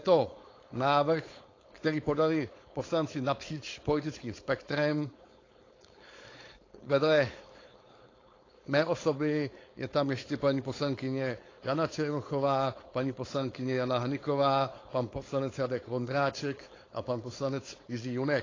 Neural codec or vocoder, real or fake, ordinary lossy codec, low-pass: codec, 16 kHz, 4.8 kbps, FACodec; fake; AAC, 32 kbps; 7.2 kHz